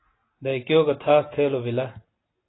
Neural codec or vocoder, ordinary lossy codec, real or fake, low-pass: none; AAC, 16 kbps; real; 7.2 kHz